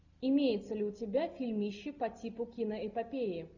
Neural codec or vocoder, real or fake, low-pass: none; real; 7.2 kHz